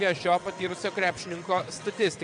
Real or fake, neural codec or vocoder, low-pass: fake; vocoder, 22.05 kHz, 80 mel bands, WaveNeXt; 9.9 kHz